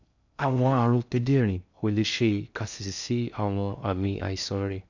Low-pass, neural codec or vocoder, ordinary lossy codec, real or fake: 7.2 kHz; codec, 16 kHz in and 24 kHz out, 0.6 kbps, FocalCodec, streaming, 2048 codes; none; fake